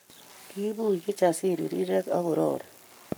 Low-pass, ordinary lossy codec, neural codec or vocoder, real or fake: none; none; codec, 44.1 kHz, 7.8 kbps, Pupu-Codec; fake